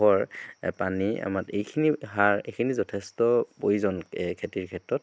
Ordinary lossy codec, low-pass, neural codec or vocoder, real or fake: none; none; none; real